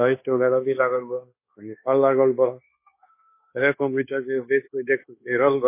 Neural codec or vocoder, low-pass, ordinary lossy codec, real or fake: codec, 16 kHz, 0.9 kbps, LongCat-Audio-Codec; 3.6 kHz; MP3, 24 kbps; fake